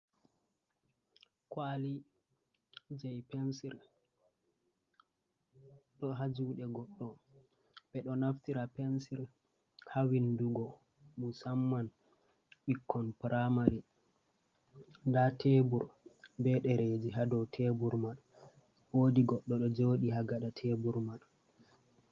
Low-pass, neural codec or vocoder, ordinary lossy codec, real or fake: 7.2 kHz; none; Opus, 32 kbps; real